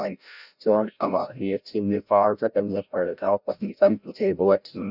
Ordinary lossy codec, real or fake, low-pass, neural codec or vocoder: none; fake; 5.4 kHz; codec, 16 kHz, 0.5 kbps, FreqCodec, larger model